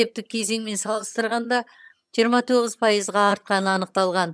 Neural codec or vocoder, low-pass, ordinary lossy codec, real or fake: vocoder, 22.05 kHz, 80 mel bands, HiFi-GAN; none; none; fake